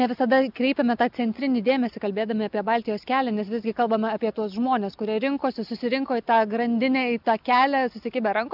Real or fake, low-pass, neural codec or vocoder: real; 5.4 kHz; none